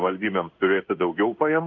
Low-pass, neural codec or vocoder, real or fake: 7.2 kHz; codec, 16 kHz in and 24 kHz out, 1 kbps, XY-Tokenizer; fake